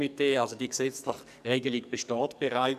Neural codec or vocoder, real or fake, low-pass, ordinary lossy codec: codec, 44.1 kHz, 2.6 kbps, SNAC; fake; 14.4 kHz; none